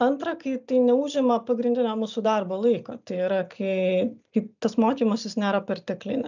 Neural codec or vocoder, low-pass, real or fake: none; 7.2 kHz; real